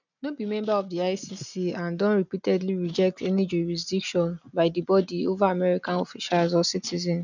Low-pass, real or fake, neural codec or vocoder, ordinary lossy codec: 7.2 kHz; real; none; none